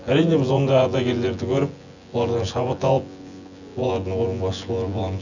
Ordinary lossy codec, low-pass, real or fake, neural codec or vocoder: none; 7.2 kHz; fake; vocoder, 24 kHz, 100 mel bands, Vocos